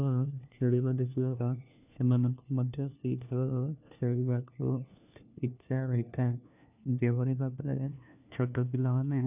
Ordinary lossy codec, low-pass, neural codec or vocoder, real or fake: none; 3.6 kHz; codec, 16 kHz, 1 kbps, FunCodec, trained on LibriTTS, 50 frames a second; fake